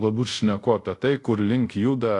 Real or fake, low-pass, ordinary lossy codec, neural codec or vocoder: fake; 10.8 kHz; AAC, 48 kbps; codec, 24 kHz, 0.5 kbps, DualCodec